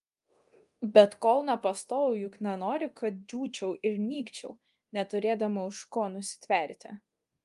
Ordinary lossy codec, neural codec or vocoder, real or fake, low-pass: Opus, 32 kbps; codec, 24 kHz, 0.9 kbps, DualCodec; fake; 10.8 kHz